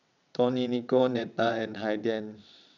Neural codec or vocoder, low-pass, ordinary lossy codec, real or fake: vocoder, 22.05 kHz, 80 mel bands, WaveNeXt; 7.2 kHz; none; fake